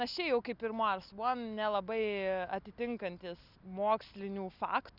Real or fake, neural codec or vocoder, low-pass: real; none; 5.4 kHz